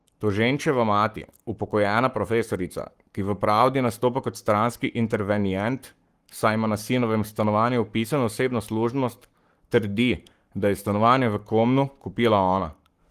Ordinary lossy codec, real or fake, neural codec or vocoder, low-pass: Opus, 24 kbps; fake; codec, 44.1 kHz, 7.8 kbps, Pupu-Codec; 14.4 kHz